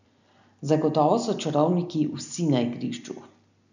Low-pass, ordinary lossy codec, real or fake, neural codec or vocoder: 7.2 kHz; none; real; none